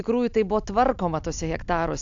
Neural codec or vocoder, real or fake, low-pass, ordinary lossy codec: none; real; 7.2 kHz; AAC, 64 kbps